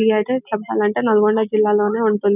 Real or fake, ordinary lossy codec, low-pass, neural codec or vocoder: real; none; 3.6 kHz; none